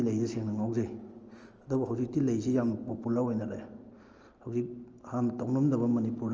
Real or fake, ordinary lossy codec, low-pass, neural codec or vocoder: real; Opus, 24 kbps; 7.2 kHz; none